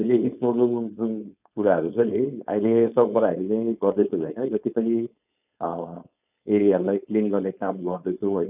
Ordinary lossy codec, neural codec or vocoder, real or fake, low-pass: none; codec, 16 kHz, 4.8 kbps, FACodec; fake; 3.6 kHz